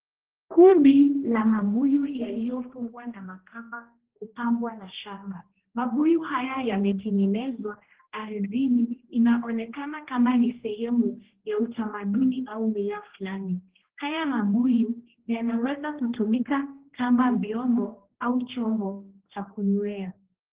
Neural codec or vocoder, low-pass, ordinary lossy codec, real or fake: codec, 16 kHz, 1 kbps, X-Codec, HuBERT features, trained on general audio; 3.6 kHz; Opus, 16 kbps; fake